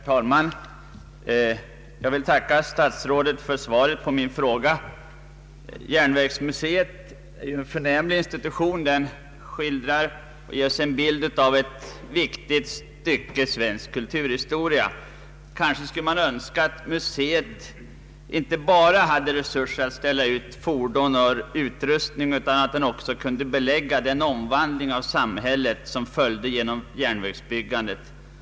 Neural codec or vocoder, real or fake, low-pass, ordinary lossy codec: none; real; none; none